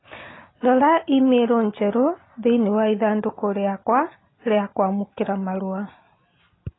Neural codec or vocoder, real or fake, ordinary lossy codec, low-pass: none; real; AAC, 16 kbps; 7.2 kHz